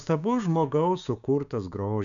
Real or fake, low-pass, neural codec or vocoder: fake; 7.2 kHz; codec, 16 kHz, 6 kbps, DAC